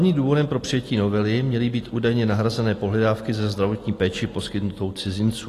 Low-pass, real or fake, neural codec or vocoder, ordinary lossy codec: 14.4 kHz; real; none; AAC, 48 kbps